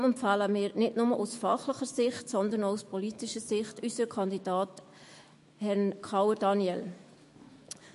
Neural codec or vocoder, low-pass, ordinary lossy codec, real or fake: autoencoder, 48 kHz, 128 numbers a frame, DAC-VAE, trained on Japanese speech; 14.4 kHz; MP3, 48 kbps; fake